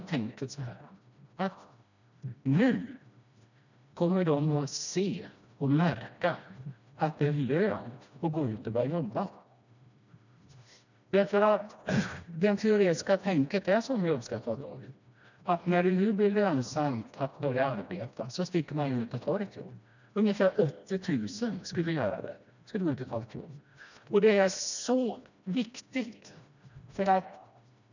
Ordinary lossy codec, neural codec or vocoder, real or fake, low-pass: none; codec, 16 kHz, 1 kbps, FreqCodec, smaller model; fake; 7.2 kHz